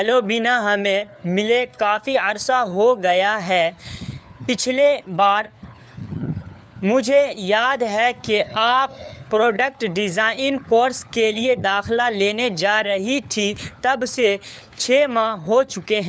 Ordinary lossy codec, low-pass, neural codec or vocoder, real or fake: none; none; codec, 16 kHz, 4 kbps, FunCodec, trained on LibriTTS, 50 frames a second; fake